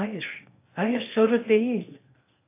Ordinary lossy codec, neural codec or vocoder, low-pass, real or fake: AAC, 24 kbps; codec, 16 kHz in and 24 kHz out, 0.8 kbps, FocalCodec, streaming, 65536 codes; 3.6 kHz; fake